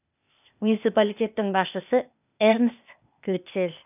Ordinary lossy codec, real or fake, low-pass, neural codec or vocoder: none; fake; 3.6 kHz; codec, 16 kHz, 0.8 kbps, ZipCodec